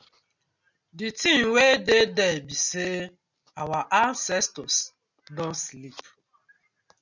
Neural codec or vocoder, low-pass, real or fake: none; 7.2 kHz; real